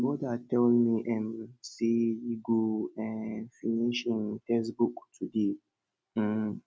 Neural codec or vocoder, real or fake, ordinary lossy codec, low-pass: none; real; none; none